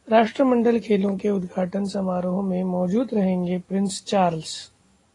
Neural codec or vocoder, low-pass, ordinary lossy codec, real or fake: none; 10.8 kHz; AAC, 32 kbps; real